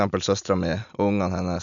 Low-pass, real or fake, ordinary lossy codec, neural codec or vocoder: 7.2 kHz; real; none; none